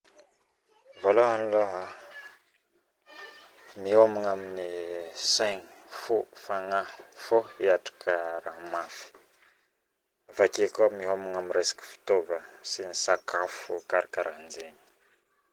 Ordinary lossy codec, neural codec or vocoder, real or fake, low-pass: Opus, 16 kbps; none; real; 19.8 kHz